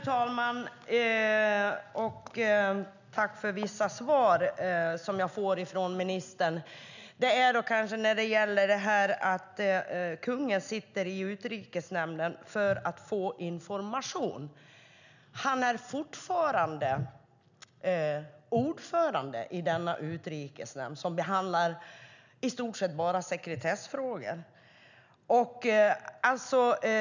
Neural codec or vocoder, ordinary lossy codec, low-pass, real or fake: none; none; 7.2 kHz; real